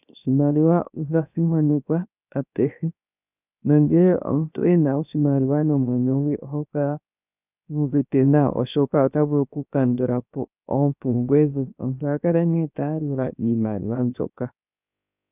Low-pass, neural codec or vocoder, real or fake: 3.6 kHz; codec, 16 kHz, 0.7 kbps, FocalCodec; fake